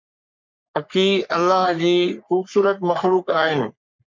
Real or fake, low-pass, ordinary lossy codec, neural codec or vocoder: fake; 7.2 kHz; MP3, 64 kbps; codec, 44.1 kHz, 3.4 kbps, Pupu-Codec